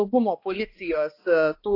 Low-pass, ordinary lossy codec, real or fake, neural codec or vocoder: 5.4 kHz; AAC, 32 kbps; fake; codec, 16 kHz, 1 kbps, X-Codec, HuBERT features, trained on balanced general audio